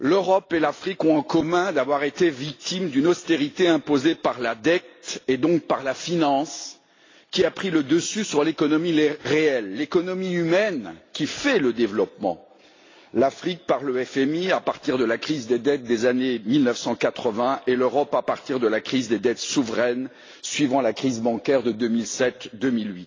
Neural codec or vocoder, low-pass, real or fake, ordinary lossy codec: none; 7.2 kHz; real; AAC, 32 kbps